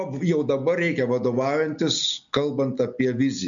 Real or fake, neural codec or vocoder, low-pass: real; none; 7.2 kHz